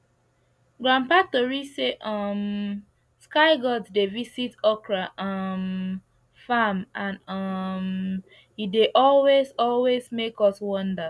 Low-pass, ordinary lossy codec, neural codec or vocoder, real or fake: none; none; none; real